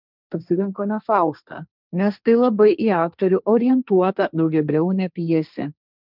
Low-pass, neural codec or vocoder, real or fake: 5.4 kHz; codec, 16 kHz, 1.1 kbps, Voila-Tokenizer; fake